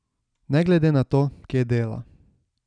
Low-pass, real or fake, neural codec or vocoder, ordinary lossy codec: 9.9 kHz; real; none; none